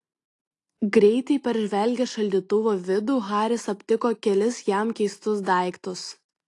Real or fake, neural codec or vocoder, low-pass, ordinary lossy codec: real; none; 10.8 kHz; AAC, 48 kbps